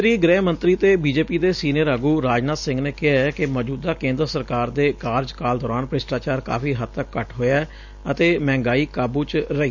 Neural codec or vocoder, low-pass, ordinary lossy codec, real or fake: none; 7.2 kHz; none; real